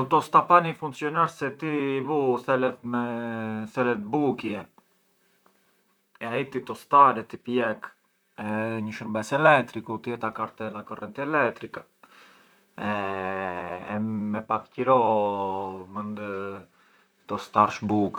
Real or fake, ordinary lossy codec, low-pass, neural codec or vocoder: fake; none; none; vocoder, 44.1 kHz, 128 mel bands, Pupu-Vocoder